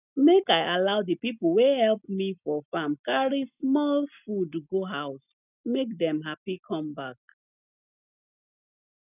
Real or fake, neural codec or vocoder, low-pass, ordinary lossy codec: real; none; 3.6 kHz; none